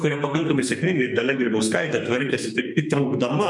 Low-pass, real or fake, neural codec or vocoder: 10.8 kHz; fake; codec, 32 kHz, 1.9 kbps, SNAC